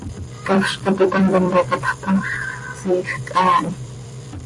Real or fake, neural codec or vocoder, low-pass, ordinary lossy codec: fake; vocoder, 44.1 kHz, 128 mel bands every 256 samples, BigVGAN v2; 10.8 kHz; AAC, 48 kbps